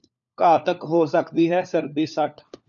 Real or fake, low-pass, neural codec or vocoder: fake; 7.2 kHz; codec, 16 kHz, 4 kbps, FunCodec, trained on LibriTTS, 50 frames a second